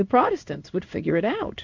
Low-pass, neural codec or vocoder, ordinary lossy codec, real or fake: 7.2 kHz; codec, 16 kHz in and 24 kHz out, 1 kbps, XY-Tokenizer; MP3, 64 kbps; fake